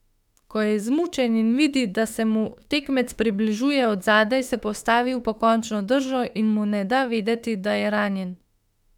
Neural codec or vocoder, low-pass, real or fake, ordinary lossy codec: autoencoder, 48 kHz, 32 numbers a frame, DAC-VAE, trained on Japanese speech; 19.8 kHz; fake; none